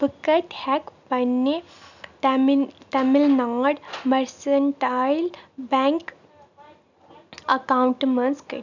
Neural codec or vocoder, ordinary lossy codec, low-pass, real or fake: none; none; 7.2 kHz; real